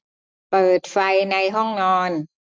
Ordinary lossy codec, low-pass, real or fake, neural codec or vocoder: none; none; real; none